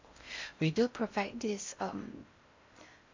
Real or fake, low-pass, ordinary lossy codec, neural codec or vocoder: fake; 7.2 kHz; MP3, 48 kbps; codec, 16 kHz in and 24 kHz out, 0.6 kbps, FocalCodec, streaming, 4096 codes